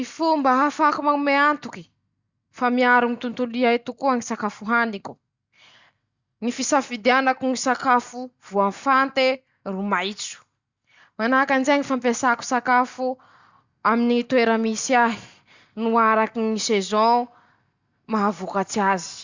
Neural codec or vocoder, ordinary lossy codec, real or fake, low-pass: none; Opus, 64 kbps; real; 7.2 kHz